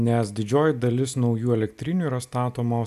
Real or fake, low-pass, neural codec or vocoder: real; 14.4 kHz; none